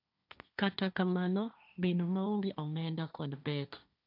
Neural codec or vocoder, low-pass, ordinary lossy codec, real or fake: codec, 16 kHz, 1.1 kbps, Voila-Tokenizer; 5.4 kHz; none; fake